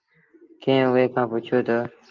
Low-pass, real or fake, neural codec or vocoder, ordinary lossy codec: 7.2 kHz; real; none; Opus, 32 kbps